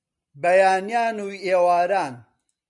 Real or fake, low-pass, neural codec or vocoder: real; 10.8 kHz; none